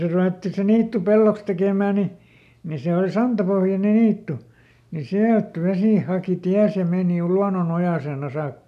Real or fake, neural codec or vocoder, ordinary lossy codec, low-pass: real; none; none; 14.4 kHz